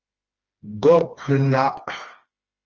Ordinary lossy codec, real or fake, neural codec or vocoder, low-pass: Opus, 24 kbps; fake; codec, 16 kHz, 2 kbps, FreqCodec, smaller model; 7.2 kHz